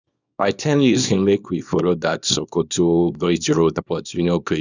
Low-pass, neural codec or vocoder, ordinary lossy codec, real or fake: 7.2 kHz; codec, 24 kHz, 0.9 kbps, WavTokenizer, small release; none; fake